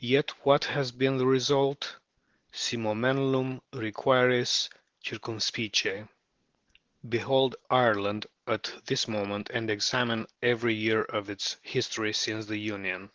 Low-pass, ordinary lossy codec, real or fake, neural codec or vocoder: 7.2 kHz; Opus, 16 kbps; real; none